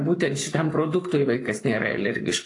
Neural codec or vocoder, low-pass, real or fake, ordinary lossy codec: codec, 44.1 kHz, 7.8 kbps, Pupu-Codec; 10.8 kHz; fake; AAC, 48 kbps